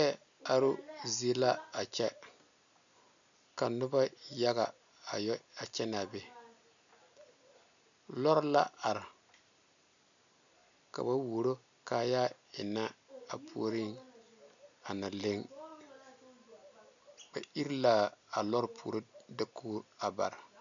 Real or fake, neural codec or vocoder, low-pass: real; none; 7.2 kHz